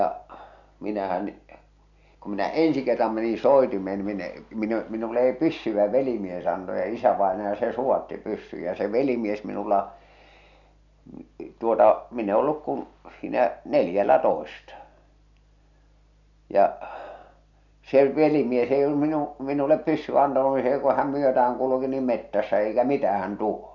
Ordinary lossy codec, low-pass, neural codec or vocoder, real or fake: none; 7.2 kHz; none; real